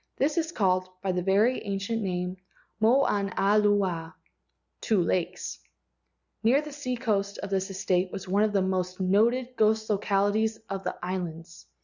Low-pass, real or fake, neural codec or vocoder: 7.2 kHz; real; none